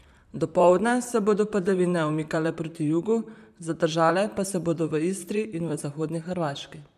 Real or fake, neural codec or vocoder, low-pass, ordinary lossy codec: fake; vocoder, 44.1 kHz, 128 mel bands, Pupu-Vocoder; 14.4 kHz; none